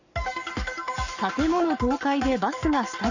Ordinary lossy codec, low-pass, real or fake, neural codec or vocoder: none; 7.2 kHz; fake; codec, 44.1 kHz, 7.8 kbps, Pupu-Codec